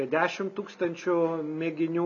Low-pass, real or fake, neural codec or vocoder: 7.2 kHz; real; none